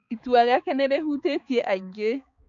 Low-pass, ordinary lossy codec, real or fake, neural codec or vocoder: 7.2 kHz; none; fake; codec, 16 kHz, 4 kbps, X-Codec, HuBERT features, trained on balanced general audio